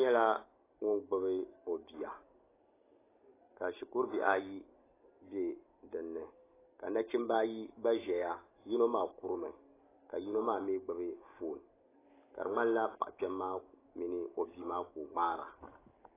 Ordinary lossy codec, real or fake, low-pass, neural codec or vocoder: AAC, 16 kbps; real; 3.6 kHz; none